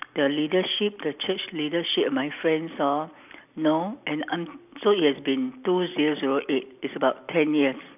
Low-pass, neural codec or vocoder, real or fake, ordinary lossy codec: 3.6 kHz; none; real; none